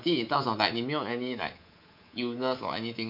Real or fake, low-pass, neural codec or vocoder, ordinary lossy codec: fake; 5.4 kHz; codec, 24 kHz, 3.1 kbps, DualCodec; none